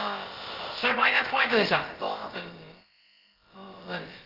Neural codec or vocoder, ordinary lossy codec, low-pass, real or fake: codec, 16 kHz, about 1 kbps, DyCAST, with the encoder's durations; Opus, 32 kbps; 5.4 kHz; fake